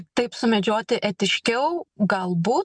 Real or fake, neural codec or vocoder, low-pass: real; none; 9.9 kHz